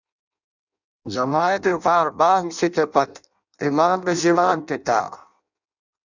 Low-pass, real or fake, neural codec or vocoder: 7.2 kHz; fake; codec, 16 kHz in and 24 kHz out, 0.6 kbps, FireRedTTS-2 codec